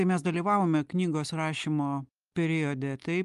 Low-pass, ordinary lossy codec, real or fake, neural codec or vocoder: 10.8 kHz; Opus, 32 kbps; real; none